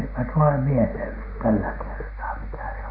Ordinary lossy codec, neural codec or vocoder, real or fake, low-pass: MP3, 32 kbps; none; real; 5.4 kHz